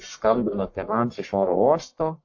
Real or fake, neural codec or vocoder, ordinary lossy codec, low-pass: fake; codec, 44.1 kHz, 1.7 kbps, Pupu-Codec; AAC, 48 kbps; 7.2 kHz